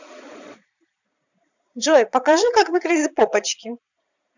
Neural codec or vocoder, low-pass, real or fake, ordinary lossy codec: vocoder, 22.05 kHz, 80 mel bands, Vocos; 7.2 kHz; fake; none